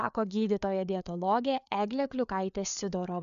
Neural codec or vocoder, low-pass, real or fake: codec, 16 kHz, 4 kbps, FreqCodec, larger model; 7.2 kHz; fake